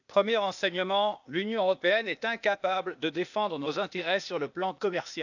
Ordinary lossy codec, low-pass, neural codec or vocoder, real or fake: none; 7.2 kHz; codec, 16 kHz, 0.8 kbps, ZipCodec; fake